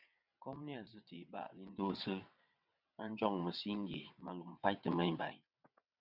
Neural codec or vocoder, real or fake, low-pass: vocoder, 22.05 kHz, 80 mel bands, WaveNeXt; fake; 5.4 kHz